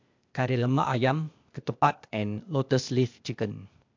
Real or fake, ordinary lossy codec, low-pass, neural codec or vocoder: fake; MP3, 64 kbps; 7.2 kHz; codec, 16 kHz, 0.8 kbps, ZipCodec